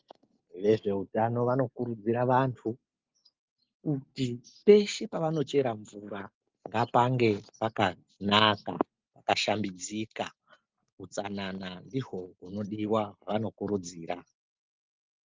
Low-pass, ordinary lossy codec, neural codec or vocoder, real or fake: 7.2 kHz; Opus, 32 kbps; none; real